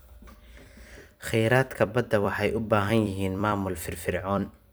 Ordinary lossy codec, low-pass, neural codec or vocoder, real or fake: none; none; vocoder, 44.1 kHz, 128 mel bands every 256 samples, BigVGAN v2; fake